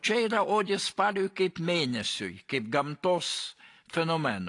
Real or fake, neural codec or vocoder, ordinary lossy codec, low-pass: real; none; AAC, 48 kbps; 10.8 kHz